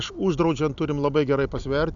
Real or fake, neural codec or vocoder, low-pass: real; none; 7.2 kHz